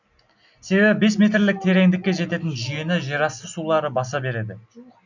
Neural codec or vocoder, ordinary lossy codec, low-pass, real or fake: none; none; 7.2 kHz; real